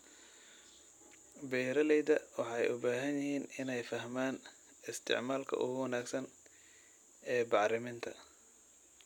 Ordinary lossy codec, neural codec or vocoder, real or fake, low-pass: none; none; real; 19.8 kHz